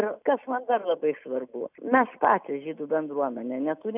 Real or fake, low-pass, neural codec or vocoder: real; 3.6 kHz; none